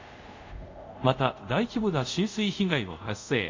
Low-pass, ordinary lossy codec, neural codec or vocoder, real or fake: 7.2 kHz; MP3, 64 kbps; codec, 24 kHz, 0.5 kbps, DualCodec; fake